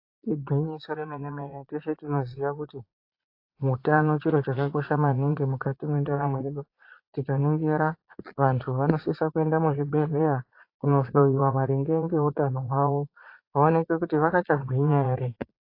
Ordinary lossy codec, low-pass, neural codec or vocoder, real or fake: AAC, 32 kbps; 5.4 kHz; vocoder, 22.05 kHz, 80 mel bands, Vocos; fake